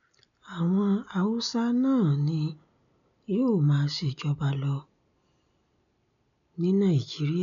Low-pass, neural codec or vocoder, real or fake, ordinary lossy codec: 7.2 kHz; none; real; none